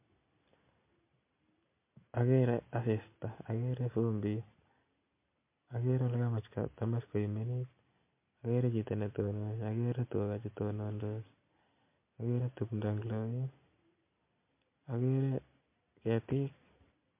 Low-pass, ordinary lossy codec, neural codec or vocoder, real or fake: 3.6 kHz; MP3, 24 kbps; none; real